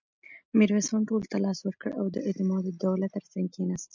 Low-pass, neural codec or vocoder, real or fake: 7.2 kHz; none; real